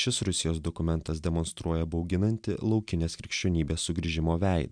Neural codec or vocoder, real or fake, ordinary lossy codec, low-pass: none; real; MP3, 96 kbps; 9.9 kHz